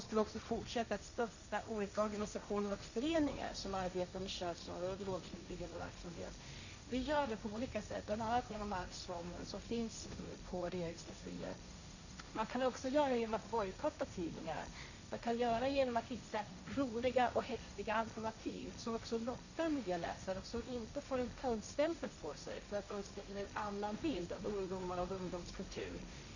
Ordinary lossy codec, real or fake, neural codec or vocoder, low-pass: none; fake; codec, 16 kHz, 1.1 kbps, Voila-Tokenizer; 7.2 kHz